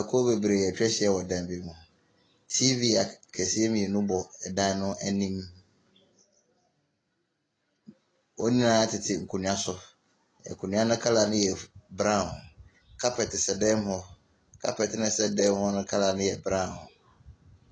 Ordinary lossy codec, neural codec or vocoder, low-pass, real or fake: AAC, 32 kbps; none; 9.9 kHz; real